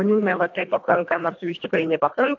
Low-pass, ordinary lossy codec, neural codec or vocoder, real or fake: 7.2 kHz; AAC, 48 kbps; codec, 24 kHz, 1.5 kbps, HILCodec; fake